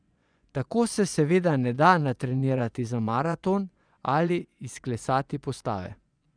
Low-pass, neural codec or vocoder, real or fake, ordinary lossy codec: 9.9 kHz; vocoder, 24 kHz, 100 mel bands, Vocos; fake; none